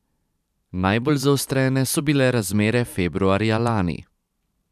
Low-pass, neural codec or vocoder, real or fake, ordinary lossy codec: 14.4 kHz; vocoder, 44.1 kHz, 128 mel bands every 512 samples, BigVGAN v2; fake; none